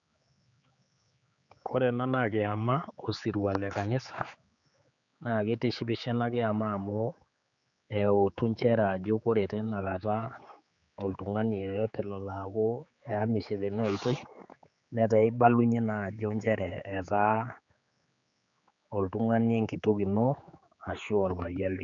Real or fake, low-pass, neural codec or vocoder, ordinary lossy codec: fake; 7.2 kHz; codec, 16 kHz, 4 kbps, X-Codec, HuBERT features, trained on general audio; none